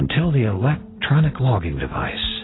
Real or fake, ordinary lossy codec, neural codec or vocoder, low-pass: real; AAC, 16 kbps; none; 7.2 kHz